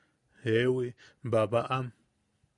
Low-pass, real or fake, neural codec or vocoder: 10.8 kHz; real; none